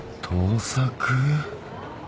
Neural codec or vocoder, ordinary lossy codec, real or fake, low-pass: none; none; real; none